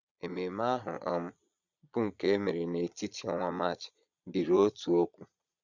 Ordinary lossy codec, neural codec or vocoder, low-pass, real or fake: none; vocoder, 22.05 kHz, 80 mel bands, Vocos; 7.2 kHz; fake